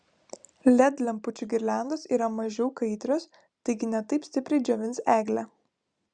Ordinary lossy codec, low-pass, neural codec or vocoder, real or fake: Opus, 64 kbps; 9.9 kHz; none; real